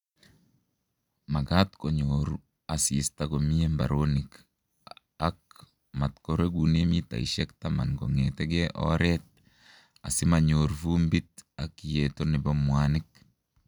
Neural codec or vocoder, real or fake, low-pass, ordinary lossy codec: none; real; 19.8 kHz; none